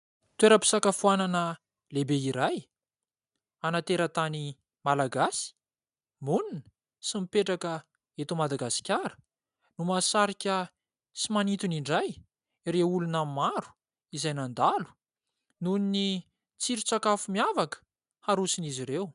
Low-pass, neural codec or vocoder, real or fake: 10.8 kHz; none; real